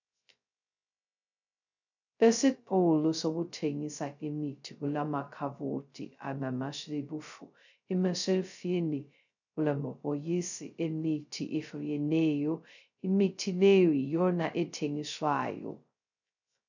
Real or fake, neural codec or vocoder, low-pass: fake; codec, 16 kHz, 0.2 kbps, FocalCodec; 7.2 kHz